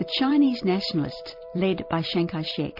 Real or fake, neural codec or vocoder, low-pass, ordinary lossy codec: fake; vocoder, 44.1 kHz, 128 mel bands every 256 samples, BigVGAN v2; 5.4 kHz; MP3, 48 kbps